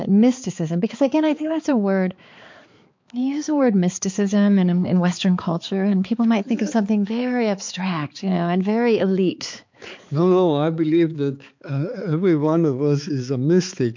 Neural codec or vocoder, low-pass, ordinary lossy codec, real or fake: codec, 16 kHz, 4 kbps, X-Codec, HuBERT features, trained on balanced general audio; 7.2 kHz; MP3, 48 kbps; fake